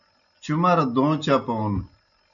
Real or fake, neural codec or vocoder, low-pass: real; none; 7.2 kHz